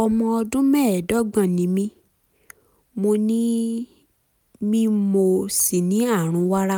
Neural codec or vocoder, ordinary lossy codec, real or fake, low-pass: none; none; real; none